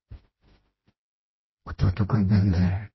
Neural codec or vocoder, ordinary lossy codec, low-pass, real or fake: codec, 16 kHz, 1 kbps, FreqCodec, smaller model; MP3, 24 kbps; 7.2 kHz; fake